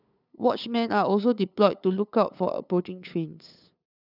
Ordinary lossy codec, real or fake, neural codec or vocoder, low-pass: none; fake; codec, 16 kHz, 8 kbps, FunCodec, trained on LibriTTS, 25 frames a second; 5.4 kHz